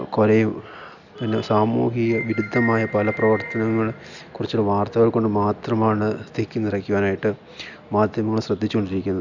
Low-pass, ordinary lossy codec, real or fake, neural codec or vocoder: 7.2 kHz; none; real; none